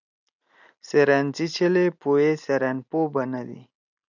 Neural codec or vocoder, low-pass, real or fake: none; 7.2 kHz; real